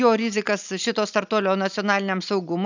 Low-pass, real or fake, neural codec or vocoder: 7.2 kHz; real; none